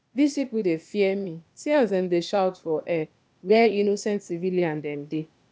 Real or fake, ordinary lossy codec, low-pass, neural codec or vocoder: fake; none; none; codec, 16 kHz, 0.8 kbps, ZipCodec